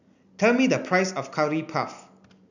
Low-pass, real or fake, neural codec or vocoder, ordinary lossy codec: 7.2 kHz; real; none; none